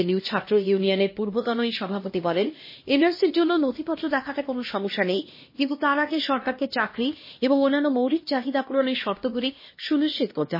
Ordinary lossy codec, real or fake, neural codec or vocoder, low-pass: MP3, 24 kbps; fake; codec, 16 kHz, 1 kbps, X-Codec, HuBERT features, trained on LibriSpeech; 5.4 kHz